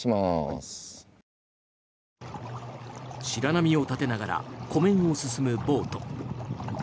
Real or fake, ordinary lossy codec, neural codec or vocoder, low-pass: real; none; none; none